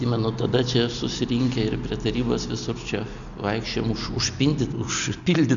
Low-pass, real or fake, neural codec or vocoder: 7.2 kHz; real; none